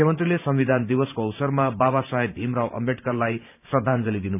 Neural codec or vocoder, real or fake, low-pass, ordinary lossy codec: vocoder, 44.1 kHz, 128 mel bands every 512 samples, BigVGAN v2; fake; 3.6 kHz; none